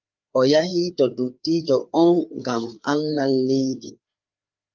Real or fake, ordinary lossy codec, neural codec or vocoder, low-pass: fake; Opus, 32 kbps; codec, 16 kHz, 4 kbps, FreqCodec, larger model; 7.2 kHz